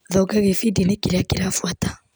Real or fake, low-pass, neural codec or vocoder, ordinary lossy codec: real; none; none; none